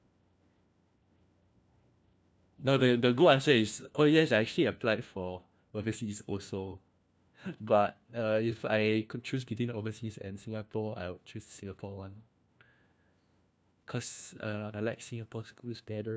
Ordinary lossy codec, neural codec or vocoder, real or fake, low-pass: none; codec, 16 kHz, 1 kbps, FunCodec, trained on LibriTTS, 50 frames a second; fake; none